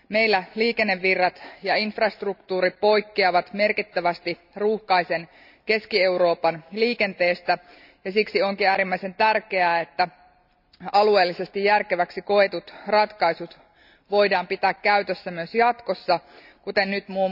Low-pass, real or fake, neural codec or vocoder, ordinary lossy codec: 5.4 kHz; real; none; none